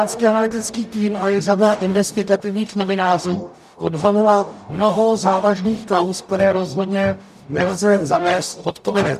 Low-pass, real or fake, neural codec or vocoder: 14.4 kHz; fake; codec, 44.1 kHz, 0.9 kbps, DAC